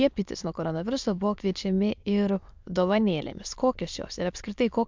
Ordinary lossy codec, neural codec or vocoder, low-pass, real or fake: MP3, 64 kbps; autoencoder, 22.05 kHz, a latent of 192 numbers a frame, VITS, trained on many speakers; 7.2 kHz; fake